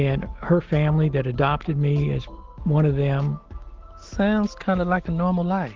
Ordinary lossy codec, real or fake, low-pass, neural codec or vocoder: Opus, 16 kbps; real; 7.2 kHz; none